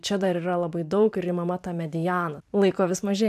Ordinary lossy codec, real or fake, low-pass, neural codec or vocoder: AAC, 96 kbps; fake; 14.4 kHz; vocoder, 44.1 kHz, 128 mel bands every 512 samples, BigVGAN v2